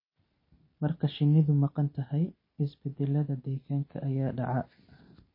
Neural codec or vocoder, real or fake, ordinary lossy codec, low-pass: none; real; MP3, 24 kbps; 5.4 kHz